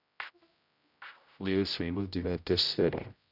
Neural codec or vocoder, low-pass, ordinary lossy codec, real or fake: codec, 16 kHz, 0.5 kbps, X-Codec, HuBERT features, trained on general audio; 5.4 kHz; none; fake